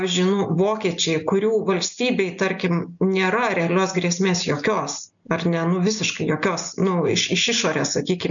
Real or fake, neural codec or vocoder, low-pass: real; none; 7.2 kHz